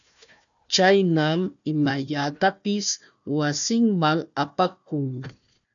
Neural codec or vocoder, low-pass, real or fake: codec, 16 kHz, 1 kbps, FunCodec, trained on Chinese and English, 50 frames a second; 7.2 kHz; fake